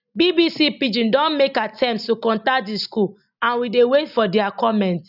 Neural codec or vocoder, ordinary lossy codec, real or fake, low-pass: none; none; real; 5.4 kHz